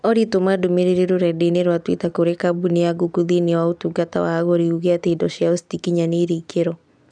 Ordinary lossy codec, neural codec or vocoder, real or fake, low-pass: none; none; real; 9.9 kHz